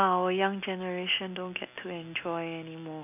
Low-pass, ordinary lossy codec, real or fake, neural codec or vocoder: 3.6 kHz; none; real; none